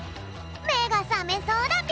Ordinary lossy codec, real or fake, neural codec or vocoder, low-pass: none; real; none; none